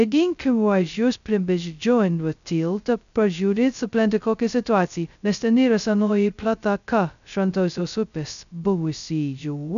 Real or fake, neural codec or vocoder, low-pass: fake; codec, 16 kHz, 0.2 kbps, FocalCodec; 7.2 kHz